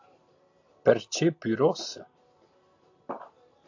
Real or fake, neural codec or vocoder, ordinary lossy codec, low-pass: real; none; AAC, 48 kbps; 7.2 kHz